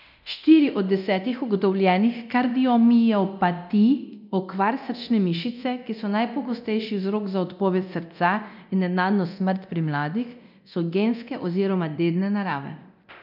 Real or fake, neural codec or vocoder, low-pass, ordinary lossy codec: fake; codec, 24 kHz, 0.9 kbps, DualCodec; 5.4 kHz; none